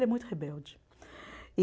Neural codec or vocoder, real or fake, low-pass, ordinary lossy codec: none; real; none; none